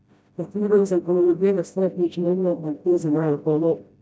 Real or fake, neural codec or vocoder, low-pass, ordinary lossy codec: fake; codec, 16 kHz, 0.5 kbps, FreqCodec, smaller model; none; none